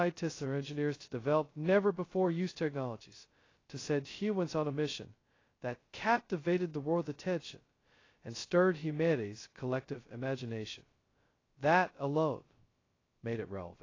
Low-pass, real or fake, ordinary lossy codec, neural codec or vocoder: 7.2 kHz; fake; AAC, 32 kbps; codec, 16 kHz, 0.2 kbps, FocalCodec